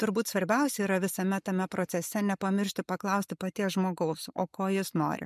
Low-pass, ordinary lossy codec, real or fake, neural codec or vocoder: 14.4 kHz; MP3, 96 kbps; real; none